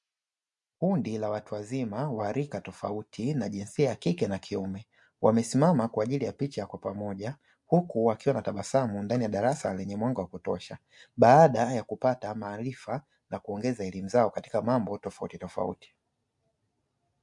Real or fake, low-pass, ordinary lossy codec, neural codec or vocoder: real; 10.8 kHz; MP3, 64 kbps; none